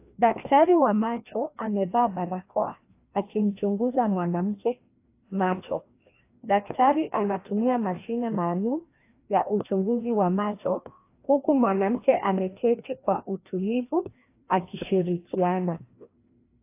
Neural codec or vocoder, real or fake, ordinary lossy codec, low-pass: codec, 16 kHz, 1 kbps, FreqCodec, larger model; fake; AAC, 24 kbps; 3.6 kHz